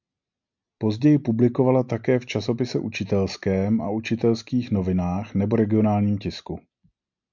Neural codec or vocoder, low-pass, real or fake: none; 7.2 kHz; real